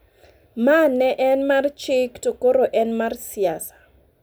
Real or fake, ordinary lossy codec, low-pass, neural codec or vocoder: real; none; none; none